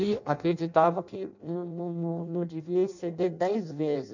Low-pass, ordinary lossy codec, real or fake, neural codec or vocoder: 7.2 kHz; none; fake; codec, 16 kHz in and 24 kHz out, 0.6 kbps, FireRedTTS-2 codec